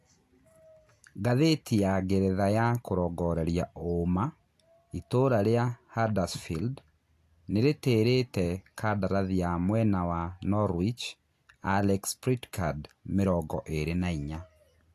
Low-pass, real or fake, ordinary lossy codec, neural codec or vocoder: 14.4 kHz; real; AAC, 64 kbps; none